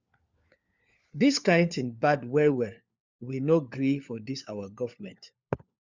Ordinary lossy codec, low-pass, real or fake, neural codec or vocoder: Opus, 64 kbps; 7.2 kHz; fake; codec, 16 kHz, 4 kbps, FunCodec, trained on LibriTTS, 50 frames a second